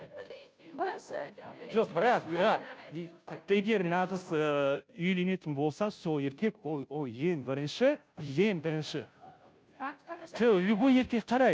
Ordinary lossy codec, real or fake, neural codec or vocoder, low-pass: none; fake; codec, 16 kHz, 0.5 kbps, FunCodec, trained on Chinese and English, 25 frames a second; none